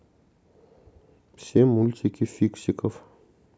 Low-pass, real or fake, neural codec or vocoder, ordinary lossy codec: none; real; none; none